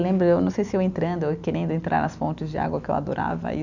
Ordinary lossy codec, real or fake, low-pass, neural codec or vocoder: none; real; 7.2 kHz; none